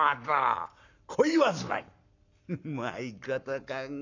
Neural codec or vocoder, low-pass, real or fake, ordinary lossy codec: codec, 44.1 kHz, 7.8 kbps, Pupu-Codec; 7.2 kHz; fake; none